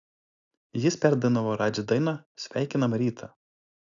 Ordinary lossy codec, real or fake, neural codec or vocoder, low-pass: AAC, 64 kbps; real; none; 7.2 kHz